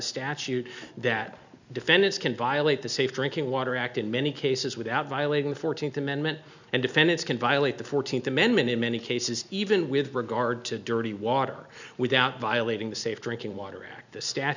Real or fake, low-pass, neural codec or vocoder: real; 7.2 kHz; none